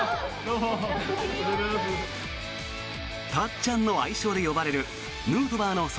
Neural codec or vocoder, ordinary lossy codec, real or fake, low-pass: none; none; real; none